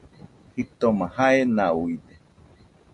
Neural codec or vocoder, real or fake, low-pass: none; real; 10.8 kHz